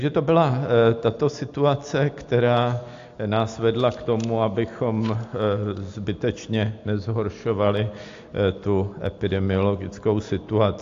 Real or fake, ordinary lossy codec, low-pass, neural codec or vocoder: real; AAC, 48 kbps; 7.2 kHz; none